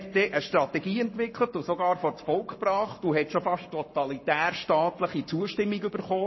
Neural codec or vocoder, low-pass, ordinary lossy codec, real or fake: codec, 44.1 kHz, 7.8 kbps, Pupu-Codec; 7.2 kHz; MP3, 24 kbps; fake